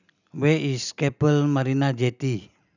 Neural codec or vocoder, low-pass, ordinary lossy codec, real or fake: none; 7.2 kHz; none; real